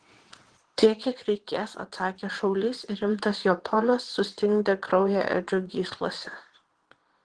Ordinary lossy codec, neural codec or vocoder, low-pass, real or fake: Opus, 16 kbps; vocoder, 22.05 kHz, 80 mel bands, WaveNeXt; 9.9 kHz; fake